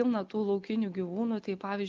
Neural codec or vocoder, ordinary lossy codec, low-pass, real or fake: none; Opus, 32 kbps; 7.2 kHz; real